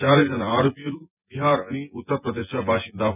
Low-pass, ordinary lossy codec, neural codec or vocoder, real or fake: 3.6 kHz; none; vocoder, 24 kHz, 100 mel bands, Vocos; fake